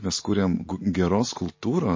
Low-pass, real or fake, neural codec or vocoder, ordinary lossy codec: 7.2 kHz; real; none; MP3, 32 kbps